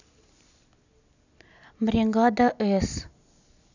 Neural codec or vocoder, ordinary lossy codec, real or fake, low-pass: none; none; real; 7.2 kHz